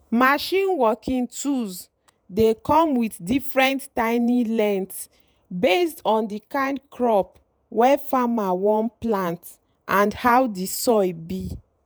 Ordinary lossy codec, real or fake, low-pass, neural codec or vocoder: none; fake; none; vocoder, 48 kHz, 128 mel bands, Vocos